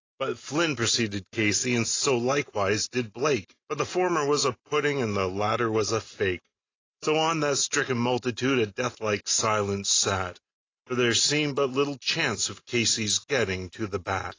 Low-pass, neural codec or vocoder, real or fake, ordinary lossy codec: 7.2 kHz; none; real; AAC, 32 kbps